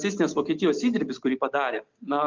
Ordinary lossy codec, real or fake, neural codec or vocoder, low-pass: Opus, 24 kbps; real; none; 7.2 kHz